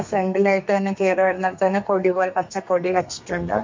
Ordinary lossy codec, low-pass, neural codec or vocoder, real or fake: MP3, 48 kbps; 7.2 kHz; codec, 32 kHz, 1.9 kbps, SNAC; fake